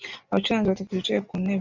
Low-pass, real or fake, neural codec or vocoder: 7.2 kHz; real; none